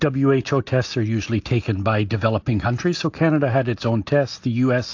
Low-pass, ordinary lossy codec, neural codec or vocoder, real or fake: 7.2 kHz; AAC, 48 kbps; none; real